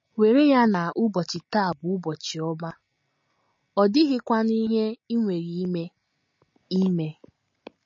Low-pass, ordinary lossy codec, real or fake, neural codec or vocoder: 7.2 kHz; MP3, 32 kbps; real; none